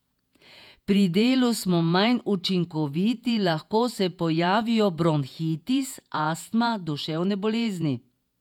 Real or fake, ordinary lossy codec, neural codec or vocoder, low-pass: fake; none; vocoder, 48 kHz, 128 mel bands, Vocos; 19.8 kHz